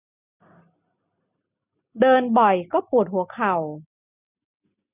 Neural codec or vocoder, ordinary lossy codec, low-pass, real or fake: none; none; 3.6 kHz; real